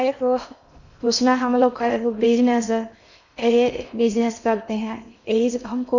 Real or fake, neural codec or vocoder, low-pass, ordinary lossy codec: fake; codec, 16 kHz in and 24 kHz out, 0.6 kbps, FocalCodec, streaming, 4096 codes; 7.2 kHz; none